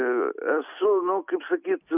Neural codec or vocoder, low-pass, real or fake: none; 3.6 kHz; real